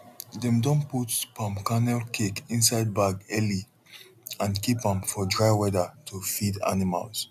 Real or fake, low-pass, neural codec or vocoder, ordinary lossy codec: real; 14.4 kHz; none; none